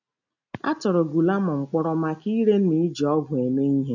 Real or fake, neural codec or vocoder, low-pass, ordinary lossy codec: real; none; 7.2 kHz; none